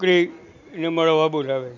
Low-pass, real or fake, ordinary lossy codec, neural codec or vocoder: 7.2 kHz; real; none; none